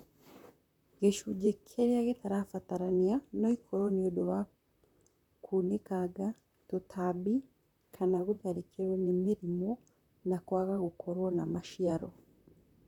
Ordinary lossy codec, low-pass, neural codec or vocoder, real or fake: none; 19.8 kHz; vocoder, 44.1 kHz, 128 mel bands, Pupu-Vocoder; fake